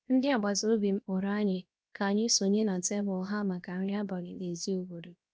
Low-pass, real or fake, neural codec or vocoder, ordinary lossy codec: none; fake; codec, 16 kHz, 0.7 kbps, FocalCodec; none